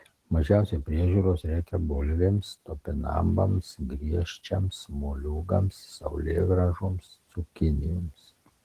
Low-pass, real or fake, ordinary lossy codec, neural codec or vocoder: 14.4 kHz; real; Opus, 16 kbps; none